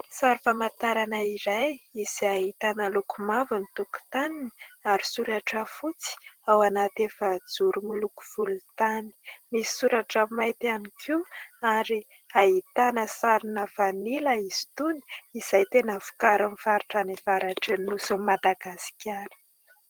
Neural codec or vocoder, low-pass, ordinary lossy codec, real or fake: vocoder, 44.1 kHz, 128 mel bands every 512 samples, BigVGAN v2; 19.8 kHz; Opus, 16 kbps; fake